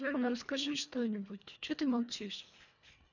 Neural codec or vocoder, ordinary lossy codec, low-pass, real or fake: codec, 24 kHz, 1.5 kbps, HILCodec; none; 7.2 kHz; fake